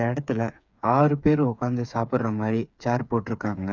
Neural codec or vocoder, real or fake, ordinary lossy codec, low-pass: codec, 16 kHz, 8 kbps, FreqCodec, smaller model; fake; none; 7.2 kHz